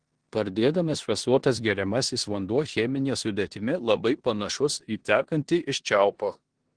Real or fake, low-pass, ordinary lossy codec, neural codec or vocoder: fake; 9.9 kHz; Opus, 16 kbps; codec, 16 kHz in and 24 kHz out, 0.9 kbps, LongCat-Audio-Codec, four codebook decoder